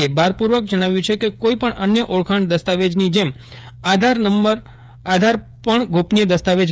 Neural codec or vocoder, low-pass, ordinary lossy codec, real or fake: codec, 16 kHz, 8 kbps, FreqCodec, smaller model; none; none; fake